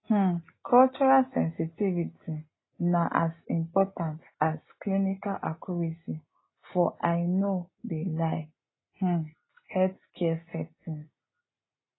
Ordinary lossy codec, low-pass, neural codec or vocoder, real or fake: AAC, 16 kbps; 7.2 kHz; none; real